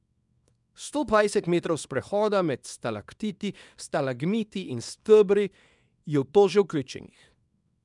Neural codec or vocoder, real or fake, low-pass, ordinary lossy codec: codec, 24 kHz, 0.9 kbps, WavTokenizer, small release; fake; 10.8 kHz; none